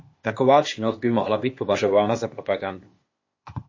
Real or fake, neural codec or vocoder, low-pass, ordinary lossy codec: fake; codec, 16 kHz, 0.8 kbps, ZipCodec; 7.2 kHz; MP3, 32 kbps